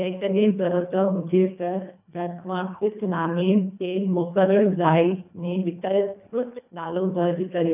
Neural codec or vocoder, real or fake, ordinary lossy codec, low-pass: codec, 24 kHz, 1.5 kbps, HILCodec; fake; none; 3.6 kHz